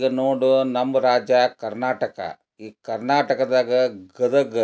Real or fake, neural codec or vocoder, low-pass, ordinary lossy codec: real; none; none; none